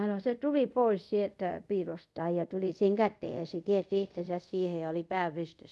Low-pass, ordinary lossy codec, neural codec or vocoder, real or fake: none; none; codec, 24 kHz, 0.5 kbps, DualCodec; fake